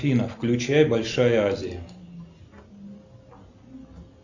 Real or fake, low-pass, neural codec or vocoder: real; 7.2 kHz; none